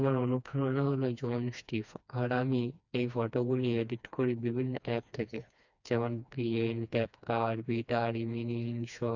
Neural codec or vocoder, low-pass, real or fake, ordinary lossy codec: codec, 16 kHz, 2 kbps, FreqCodec, smaller model; 7.2 kHz; fake; none